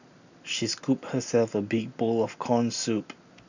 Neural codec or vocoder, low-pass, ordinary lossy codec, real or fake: none; 7.2 kHz; none; real